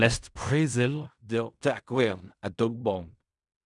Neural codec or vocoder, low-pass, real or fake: codec, 16 kHz in and 24 kHz out, 0.4 kbps, LongCat-Audio-Codec, fine tuned four codebook decoder; 10.8 kHz; fake